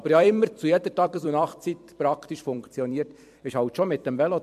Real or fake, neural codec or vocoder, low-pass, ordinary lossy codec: fake; vocoder, 44.1 kHz, 128 mel bands every 256 samples, BigVGAN v2; 14.4 kHz; MP3, 64 kbps